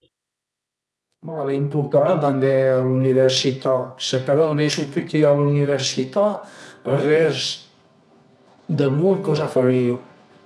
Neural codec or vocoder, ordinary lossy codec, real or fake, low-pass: codec, 24 kHz, 0.9 kbps, WavTokenizer, medium music audio release; none; fake; none